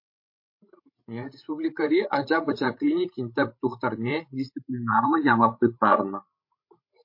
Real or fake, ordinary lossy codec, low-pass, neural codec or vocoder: fake; MP3, 32 kbps; 5.4 kHz; codec, 16 kHz, 16 kbps, FreqCodec, larger model